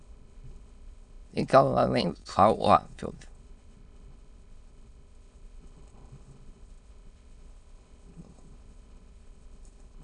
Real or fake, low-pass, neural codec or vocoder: fake; 9.9 kHz; autoencoder, 22.05 kHz, a latent of 192 numbers a frame, VITS, trained on many speakers